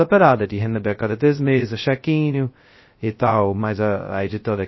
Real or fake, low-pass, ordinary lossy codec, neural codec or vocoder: fake; 7.2 kHz; MP3, 24 kbps; codec, 16 kHz, 0.2 kbps, FocalCodec